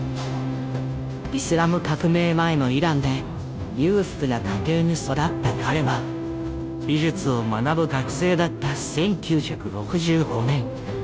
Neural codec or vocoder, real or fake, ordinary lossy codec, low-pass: codec, 16 kHz, 0.5 kbps, FunCodec, trained on Chinese and English, 25 frames a second; fake; none; none